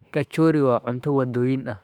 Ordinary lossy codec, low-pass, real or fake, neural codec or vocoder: none; 19.8 kHz; fake; autoencoder, 48 kHz, 32 numbers a frame, DAC-VAE, trained on Japanese speech